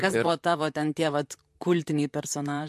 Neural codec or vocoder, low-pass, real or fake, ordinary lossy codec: vocoder, 44.1 kHz, 128 mel bands, Pupu-Vocoder; 14.4 kHz; fake; MP3, 64 kbps